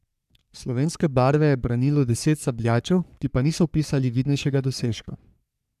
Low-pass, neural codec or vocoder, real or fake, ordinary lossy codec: 14.4 kHz; codec, 44.1 kHz, 3.4 kbps, Pupu-Codec; fake; none